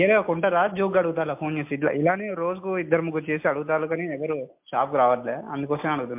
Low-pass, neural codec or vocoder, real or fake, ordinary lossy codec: 3.6 kHz; none; real; MP3, 32 kbps